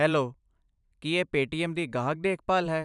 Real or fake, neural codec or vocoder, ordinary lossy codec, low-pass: fake; vocoder, 44.1 kHz, 128 mel bands every 512 samples, BigVGAN v2; none; 10.8 kHz